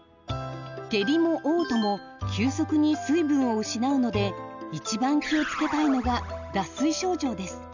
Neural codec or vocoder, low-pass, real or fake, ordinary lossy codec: none; 7.2 kHz; real; none